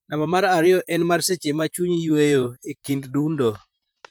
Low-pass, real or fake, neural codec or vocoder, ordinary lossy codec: none; fake; vocoder, 44.1 kHz, 128 mel bands, Pupu-Vocoder; none